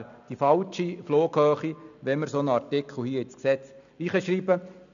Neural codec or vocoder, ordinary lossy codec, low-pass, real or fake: none; none; 7.2 kHz; real